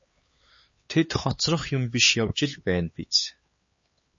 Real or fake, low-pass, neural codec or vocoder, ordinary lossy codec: fake; 7.2 kHz; codec, 16 kHz, 4 kbps, X-Codec, HuBERT features, trained on LibriSpeech; MP3, 32 kbps